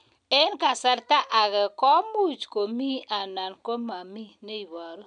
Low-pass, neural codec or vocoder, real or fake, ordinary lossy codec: 9.9 kHz; none; real; none